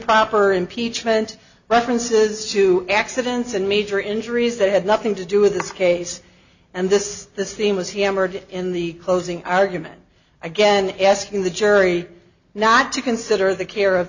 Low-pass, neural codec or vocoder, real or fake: 7.2 kHz; none; real